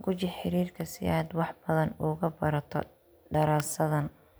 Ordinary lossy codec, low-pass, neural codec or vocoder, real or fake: none; none; none; real